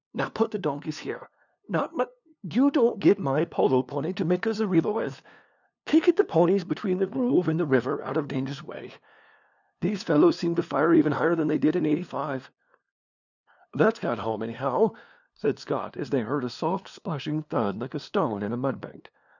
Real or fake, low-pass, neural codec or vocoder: fake; 7.2 kHz; codec, 16 kHz, 2 kbps, FunCodec, trained on LibriTTS, 25 frames a second